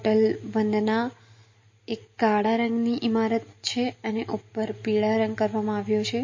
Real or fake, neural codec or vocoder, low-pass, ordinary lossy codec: real; none; 7.2 kHz; MP3, 32 kbps